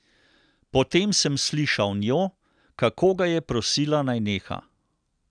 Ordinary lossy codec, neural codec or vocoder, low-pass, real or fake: none; none; 9.9 kHz; real